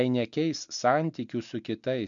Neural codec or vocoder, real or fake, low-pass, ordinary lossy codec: none; real; 7.2 kHz; MP3, 64 kbps